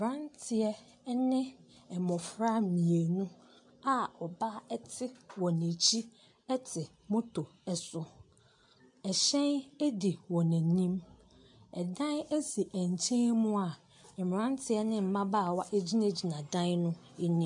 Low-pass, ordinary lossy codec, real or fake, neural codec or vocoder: 10.8 kHz; MP3, 64 kbps; real; none